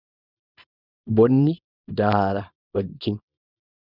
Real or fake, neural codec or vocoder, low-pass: fake; codec, 24 kHz, 0.9 kbps, WavTokenizer, small release; 5.4 kHz